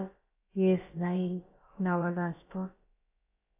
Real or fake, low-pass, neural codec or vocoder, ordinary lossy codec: fake; 3.6 kHz; codec, 16 kHz, about 1 kbps, DyCAST, with the encoder's durations; AAC, 32 kbps